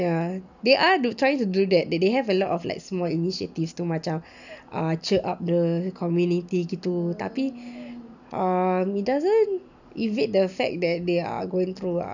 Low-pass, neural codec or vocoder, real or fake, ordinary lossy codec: 7.2 kHz; none; real; none